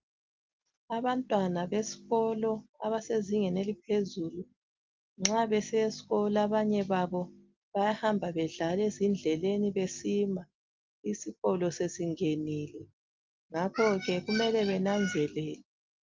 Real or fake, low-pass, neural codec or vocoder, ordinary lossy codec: real; 7.2 kHz; none; Opus, 32 kbps